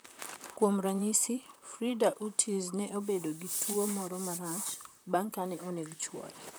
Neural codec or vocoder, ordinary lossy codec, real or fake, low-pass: vocoder, 44.1 kHz, 128 mel bands, Pupu-Vocoder; none; fake; none